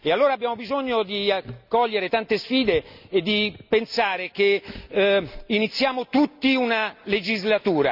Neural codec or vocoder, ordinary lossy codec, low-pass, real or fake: none; none; 5.4 kHz; real